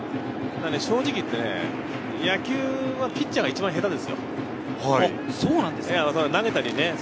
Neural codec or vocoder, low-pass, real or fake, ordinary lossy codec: none; none; real; none